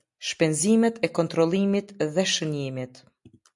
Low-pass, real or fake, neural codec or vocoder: 10.8 kHz; real; none